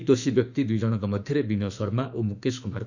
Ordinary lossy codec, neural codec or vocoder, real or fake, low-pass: none; autoencoder, 48 kHz, 32 numbers a frame, DAC-VAE, trained on Japanese speech; fake; 7.2 kHz